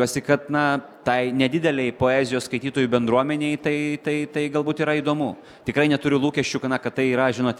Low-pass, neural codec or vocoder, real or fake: 19.8 kHz; none; real